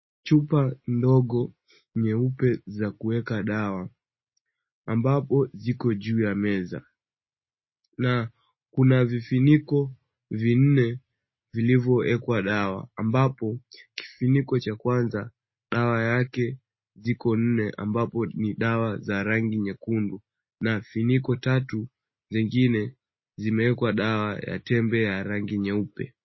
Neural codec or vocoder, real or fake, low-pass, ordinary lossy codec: none; real; 7.2 kHz; MP3, 24 kbps